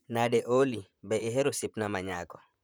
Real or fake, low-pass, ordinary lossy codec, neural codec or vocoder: fake; none; none; vocoder, 44.1 kHz, 128 mel bands, Pupu-Vocoder